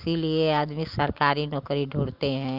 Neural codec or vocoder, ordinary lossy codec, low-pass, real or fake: none; Opus, 24 kbps; 5.4 kHz; real